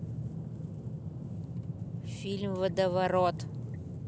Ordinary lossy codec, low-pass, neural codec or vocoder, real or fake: none; none; none; real